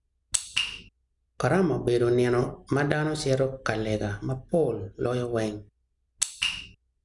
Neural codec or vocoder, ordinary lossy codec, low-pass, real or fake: none; none; 10.8 kHz; real